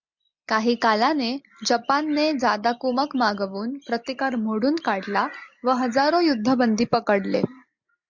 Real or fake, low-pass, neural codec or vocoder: real; 7.2 kHz; none